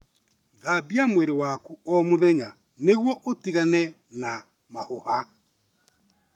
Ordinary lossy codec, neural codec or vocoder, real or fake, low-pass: none; codec, 44.1 kHz, 7.8 kbps, Pupu-Codec; fake; 19.8 kHz